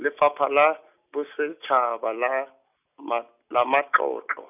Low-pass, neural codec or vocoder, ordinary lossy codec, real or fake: 3.6 kHz; none; none; real